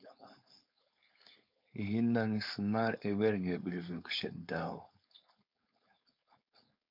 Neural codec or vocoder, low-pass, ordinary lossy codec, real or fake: codec, 16 kHz, 4.8 kbps, FACodec; 5.4 kHz; MP3, 48 kbps; fake